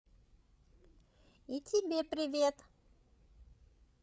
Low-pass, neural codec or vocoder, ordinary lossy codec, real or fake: none; codec, 16 kHz, 8 kbps, FreqCodec, larger model; none; fake